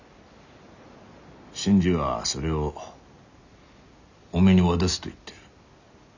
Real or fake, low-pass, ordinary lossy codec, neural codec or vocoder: real; 7.2 kHz; none; none